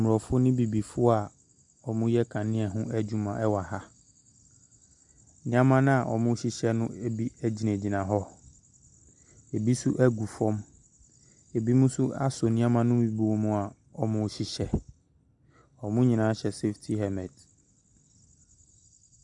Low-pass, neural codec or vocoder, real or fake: 10.8 kHz; none; real